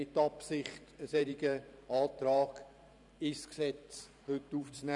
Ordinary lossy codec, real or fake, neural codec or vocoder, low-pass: none; fake; vocoder, 44.1 kHz, 128 mel bands every 512 samples, BigVGAN v2; 10.8 kHz